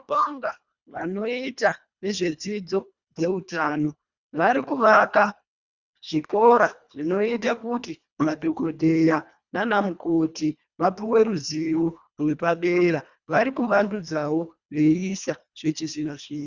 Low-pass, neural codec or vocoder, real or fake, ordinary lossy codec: 7.2 kHz; codec, 24 kHz, 1.5 kbps, HILCodec; fake; Opus, 64 kbps